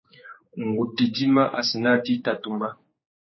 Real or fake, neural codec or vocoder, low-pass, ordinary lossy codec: fake; codec, 16 kHz, 4 kbps, X-Codec, HuBERT features, trained on general audio; 7.2 kHz; MP3, 24 kbps